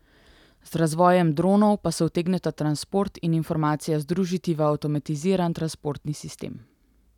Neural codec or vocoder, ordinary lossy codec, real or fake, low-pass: none; none; real; 19.8 kHz